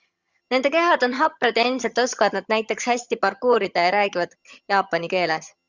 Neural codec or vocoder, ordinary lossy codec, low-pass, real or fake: vocoder, 22.05 kHz, 80 mel bands, HiFi-GAN; Opus, 64 kbps; 7.2 kHz; fake